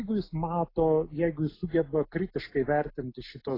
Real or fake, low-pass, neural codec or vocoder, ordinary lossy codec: real; 5.4 kHz; none; AAC, 24 kbps